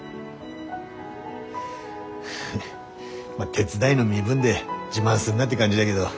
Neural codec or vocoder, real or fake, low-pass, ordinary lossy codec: none; real; none; none